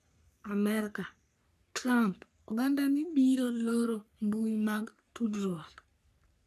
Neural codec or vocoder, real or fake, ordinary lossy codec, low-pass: codec, 44.1 kHz, 3.4 kbps, Pupu-Codec; fake; none; 14.4 kHz